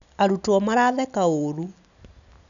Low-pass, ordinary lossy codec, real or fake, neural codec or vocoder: 7.2 kHz; none; real; none